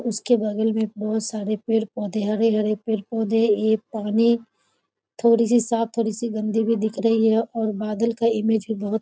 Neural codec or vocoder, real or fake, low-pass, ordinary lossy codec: none; real; none; none